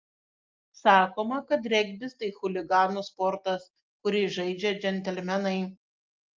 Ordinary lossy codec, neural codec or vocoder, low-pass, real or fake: Opus, 32 kbps; none; 7.2 kHz; real